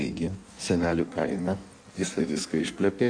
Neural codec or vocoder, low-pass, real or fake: codec, 16 kHz in and 24 kHz out, 1.1 kbps, FireRedTTS-2 codec; 9.9 kHz; fake